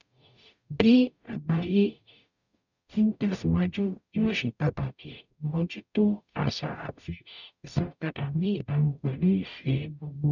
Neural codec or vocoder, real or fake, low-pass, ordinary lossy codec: codec, 44.1 kHz, 0.9 kbps, DAC; fake; 7.2 kHz; none